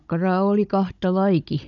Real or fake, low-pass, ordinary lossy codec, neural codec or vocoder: fake; 7.2 kHz; none; codec, 16 kHz, 16 kbps, FunCodec, trained on Chinese and English, 50 frames a second